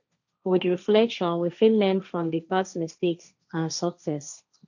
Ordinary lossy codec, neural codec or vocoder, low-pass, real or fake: none; codec, 16 kHz, 1.1 kbps, Voila-Tokenizer; 7.2 kHz; fake